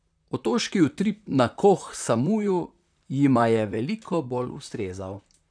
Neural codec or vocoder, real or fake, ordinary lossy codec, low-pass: vocoder, 44.1 kHz, 128 mel bands, Pupu-Vocoder; fake; none; 9.9 kHz